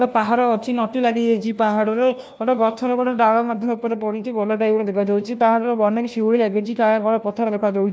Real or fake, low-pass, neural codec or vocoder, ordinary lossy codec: fake; none; codec, 16 kHz, 1 kbps, FunCodec, trained on LibriTTS, 50 frames a second; none